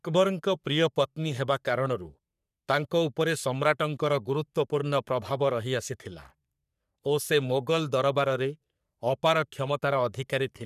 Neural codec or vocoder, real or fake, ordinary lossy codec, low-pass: codec, 44.1 kHz, 3.4 kbps, Pupu-Codec; fake; none; 14.4 kHz